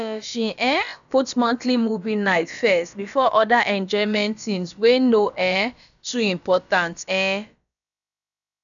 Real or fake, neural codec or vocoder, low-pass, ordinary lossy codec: fake; codec, 16 kHz, about 1 kbps, DyCAST, with the encoder's durations; 7.2 kHz; none